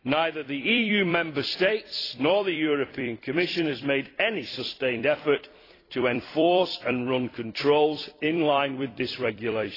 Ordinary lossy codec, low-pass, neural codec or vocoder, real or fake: AAC, 24 kbps; 5.4 kHz; vocoder, 44.1 kHz, 128 mel bands every 256 samples, BigVGAN v2; fake